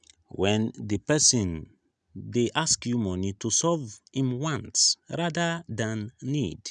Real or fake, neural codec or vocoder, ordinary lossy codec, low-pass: real; none; none; 9.9 kHz